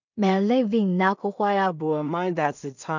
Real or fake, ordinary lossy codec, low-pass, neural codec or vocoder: fake; none; 7.2 kHz; codec, 16 kHz in and 24 kHz out, 0.4 kbps, LongCat-Audio-Codec, two codebook decoder